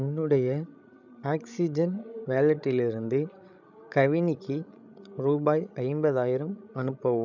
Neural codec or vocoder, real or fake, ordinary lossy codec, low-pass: codec, 16 kHz, 16 kbps, FreqCodec, larger model; fake; none; 7.2 kHz